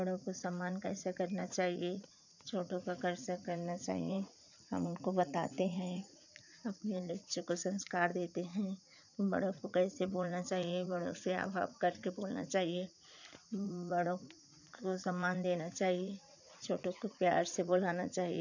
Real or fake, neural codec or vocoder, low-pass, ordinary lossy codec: fake; vocoder, 44.1 kHz, 80 mel bands, Vocos; 7.2 kHz; none